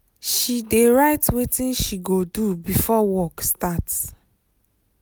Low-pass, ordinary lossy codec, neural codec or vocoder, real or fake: none; none; none; real